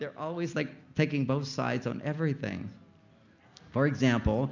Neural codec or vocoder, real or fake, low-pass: none; real; 7.2 kHz